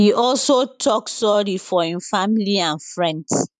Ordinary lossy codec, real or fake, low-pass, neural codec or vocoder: none; fake; 10.8 kHz; vocoder, 44.1 kHz, 128 mel bands every 512 samples, BigVGAN v2